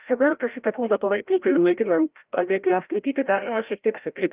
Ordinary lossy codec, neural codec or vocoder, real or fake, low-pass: Opus, 32 kbps; codec, 16 kHz, 0.5 kbps, FreqCodec, larger model; fake; 3.6 kHz